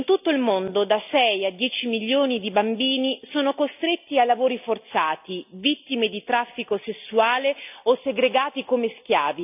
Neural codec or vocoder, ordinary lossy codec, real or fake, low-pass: none; none; real; 3.6 kHz